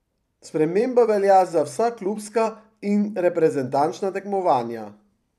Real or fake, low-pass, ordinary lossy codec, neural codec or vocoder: fake; 14.4 kHz; none; vocoder, 44.1 kHz, 128 mel bands every 512 samples, BigVGAN v2